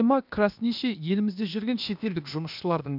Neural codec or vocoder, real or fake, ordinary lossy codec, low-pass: codec, 16 kHz, 0.8 kbps, ZipCodec; fake; none; 5.4 kHz